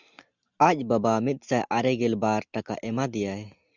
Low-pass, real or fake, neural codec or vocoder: 7.2 kHz; real; none